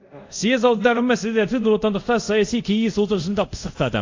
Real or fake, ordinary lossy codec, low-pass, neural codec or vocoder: fake; AAC, 48 kbps; 7.2 kHz; codec, 24 kHz, 0.5 kbps, DualCodec